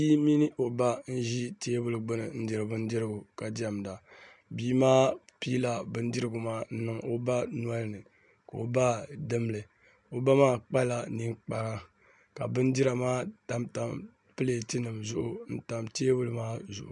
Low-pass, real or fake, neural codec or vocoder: 10.8 kHz; real; none